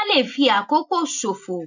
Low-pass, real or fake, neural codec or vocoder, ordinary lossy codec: 7.2 kHz; real; none; none